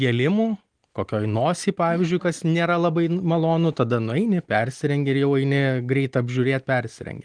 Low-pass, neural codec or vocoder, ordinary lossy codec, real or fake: 9.9 kHz; none; Opus, 24 kbps; real